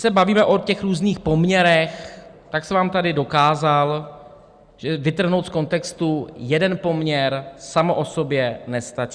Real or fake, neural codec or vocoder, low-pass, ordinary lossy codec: real; none; 9.9 kHz; Opus, 64 kbps